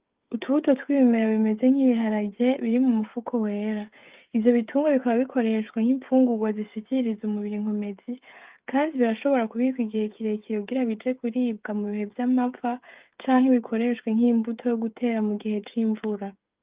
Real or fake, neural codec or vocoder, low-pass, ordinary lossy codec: fake; codec, 16 kHz, 16 kbps, FreqCodec, smaller model; 3.6 kHz; Opus, 32 kbps